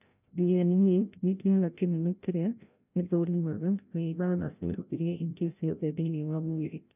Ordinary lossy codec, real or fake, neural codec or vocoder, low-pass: none; fake; codec, 16 kHz, 0.5 kbps, FreqCodec, larger model; 3.6 kHz